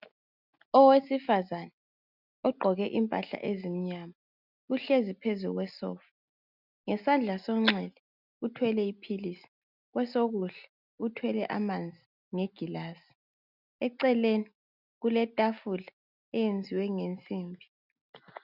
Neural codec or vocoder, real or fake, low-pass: none; real; 5.4 kHz